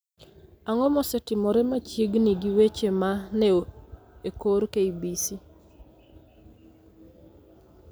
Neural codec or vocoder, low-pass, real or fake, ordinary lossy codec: none; none; real; none